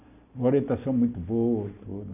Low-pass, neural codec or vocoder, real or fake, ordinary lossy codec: 3.6 kHz; none; real; none